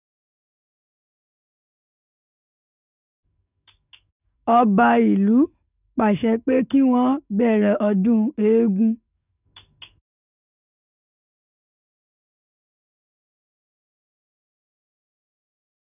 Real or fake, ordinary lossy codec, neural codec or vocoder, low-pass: fake; none; codec, 44.1 kHz, 7.8 kbps, DAC; 3.6 kHz